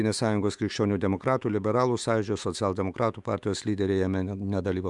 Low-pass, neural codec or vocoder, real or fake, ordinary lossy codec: 10.8 kHz; autoencoder, 48 kHz, 128 numbers a frame, DAC-VAE, trained on Japanese speech; fake; Opus, 64 kbps